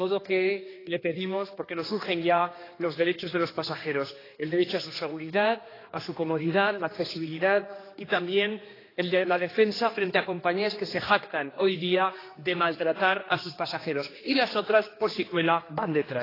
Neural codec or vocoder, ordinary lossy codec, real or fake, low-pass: codec, 16 kHz, 2 kbps, X-Codec, HuBERT features, trained on general audio; AAC, 24 kbps; fake; 5.4 kHz